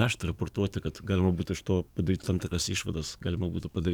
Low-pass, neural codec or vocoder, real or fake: 19.8 kHz; codec, 44.1 kHz, 7.8 kbps, DAC; fake